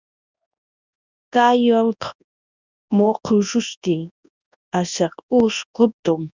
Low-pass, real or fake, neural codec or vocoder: 7.2 kHz; fake; codec, 24 kHz, 0.9 kbps, WavTokenizer, large speech release